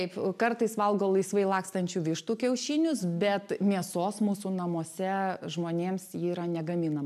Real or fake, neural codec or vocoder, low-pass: real; none; 14.4 kHz